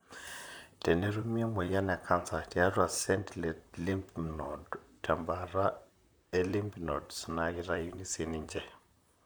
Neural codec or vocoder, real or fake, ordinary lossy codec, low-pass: vocoder, 44.1 kHz, 128 mel bands, Pupu-Vocoder; fake; none; none